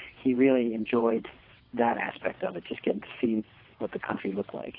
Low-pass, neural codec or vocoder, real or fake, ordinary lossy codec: 5.4 kHz; vocoder, 22.05 kHz, 80 mel bands, WaveNeXt; fake; AAC, 32 kbps